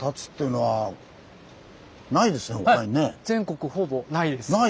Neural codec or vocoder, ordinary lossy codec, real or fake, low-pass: none; none; real; none